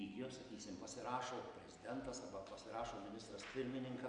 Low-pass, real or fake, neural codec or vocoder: 9.9 kHz; real; none